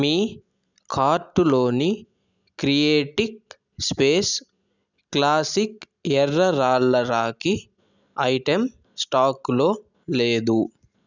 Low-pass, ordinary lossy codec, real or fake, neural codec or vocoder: 7.2 kHz; none; real; none